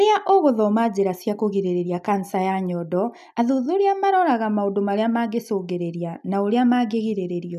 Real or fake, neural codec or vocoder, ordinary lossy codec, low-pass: real; none; none; 14.4 kHz